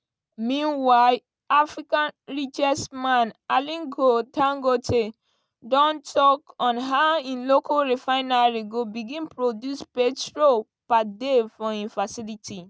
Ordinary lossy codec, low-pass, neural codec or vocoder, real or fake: none; none; none; real